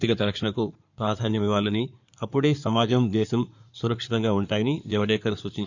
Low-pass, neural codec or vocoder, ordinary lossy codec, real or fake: 7.2 kHz; codec, 16 kHz in and 24 kHz out, 2.2 kbps, FireRedTTS-2 codec; none; fake